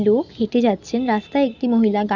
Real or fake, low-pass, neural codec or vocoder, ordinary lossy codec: real; 7.2 kHz; none; Opus, 64 kbps